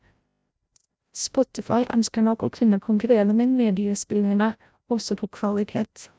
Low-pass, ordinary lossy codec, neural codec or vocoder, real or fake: none; none; codec, 16 kHz, 0.5 kbps, FreqCodec, larger model; fake